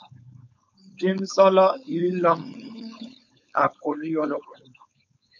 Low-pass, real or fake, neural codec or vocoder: 7.2 kHz; fake; codec, 16 kHz, 4.8 kbps, FACodec